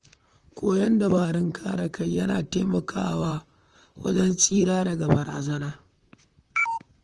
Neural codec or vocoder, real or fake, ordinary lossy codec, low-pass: vocoder, 44.1 kHz, 128 mel bands, Pupu-Vocoder; fake; Opus, 24 kbps; 10.8 kHz